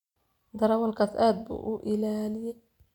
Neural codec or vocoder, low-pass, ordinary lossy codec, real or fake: none; 19.8 kHz; none; real